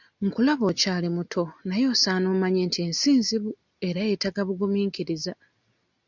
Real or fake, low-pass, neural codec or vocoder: real; 7.2 kHz; none